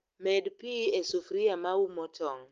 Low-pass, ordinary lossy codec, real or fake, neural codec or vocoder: 7.2 kHz; Opus, 24 kbps; real; none